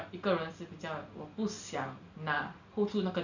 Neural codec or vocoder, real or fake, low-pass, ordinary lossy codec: none; real; 7.2 kHz; none